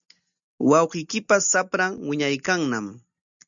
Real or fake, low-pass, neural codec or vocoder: real; 7.2 kHz; none